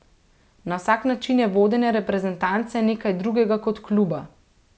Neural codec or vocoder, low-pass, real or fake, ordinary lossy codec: none; none; real; none